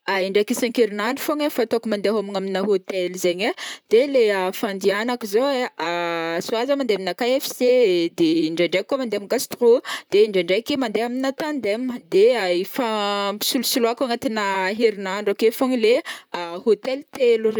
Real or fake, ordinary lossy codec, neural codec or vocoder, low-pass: fake; none; vocoder, 44.1 kHz, 128 mel bands, Pupu-Vocoder; none